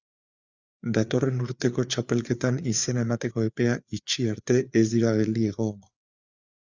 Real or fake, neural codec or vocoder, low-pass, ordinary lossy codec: fake; codec, 16 kHz, 4 kbps, FreqCodec, larger model; 7.2 kHz; Opus, 64 kbps